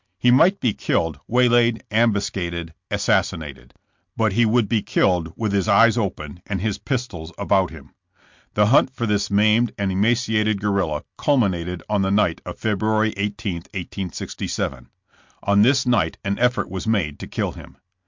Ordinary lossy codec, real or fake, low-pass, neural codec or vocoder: MP3, 64 kbps; real; 7.2 kHz; none